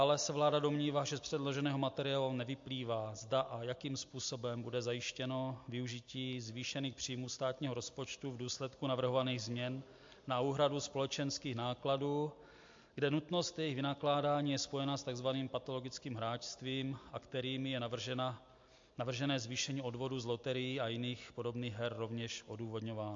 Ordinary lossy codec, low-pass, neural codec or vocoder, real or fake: MP3, 48 kbps; 7.2 kHz; none; real